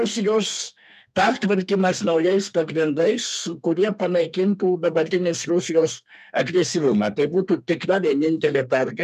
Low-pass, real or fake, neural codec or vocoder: 14.4 kHz; fake; codec, 32 kHz, 1.9 kbps, SNAC